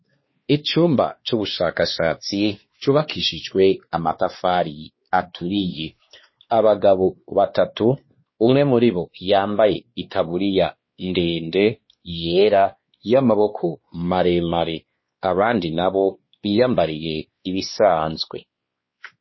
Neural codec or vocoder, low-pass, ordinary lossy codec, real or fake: codec, 16 kHz, 2 kbps, X-Codec, WavLM features, trained on Multilingual LibriSpeech; 7.2 kHz; MP3, 24 kbps; fake